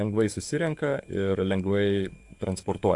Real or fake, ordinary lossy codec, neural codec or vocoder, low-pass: fake; AAC, 64 kbps; codec, 44.1 kHz, 7.8 kbps, DAC; 10.8 kHz